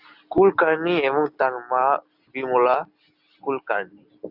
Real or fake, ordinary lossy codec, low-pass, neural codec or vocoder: real; AAC, 48 kbps; 5.4 kHz; none